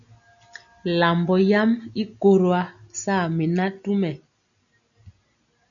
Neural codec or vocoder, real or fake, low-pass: none; real; 7.2 kHz